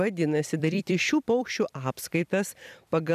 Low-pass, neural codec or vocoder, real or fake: 14.4 kHz; vocoder, 44.1 kHz, 128 mel bands every 256 samples, BigVGAN v2; fake